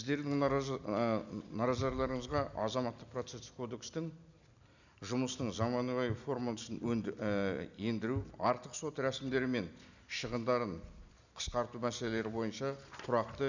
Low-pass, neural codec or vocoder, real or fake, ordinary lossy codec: 7.2 kHz; none; real; none